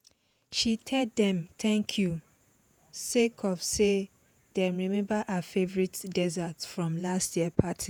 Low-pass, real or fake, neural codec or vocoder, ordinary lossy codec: none; fake; vocoder, 48 kHz, 128 mel bands, Vocos; none